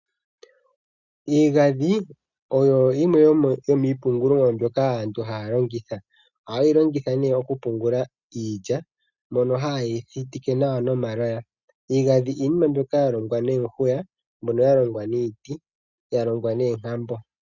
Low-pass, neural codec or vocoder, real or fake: 7.2 kHz; none; real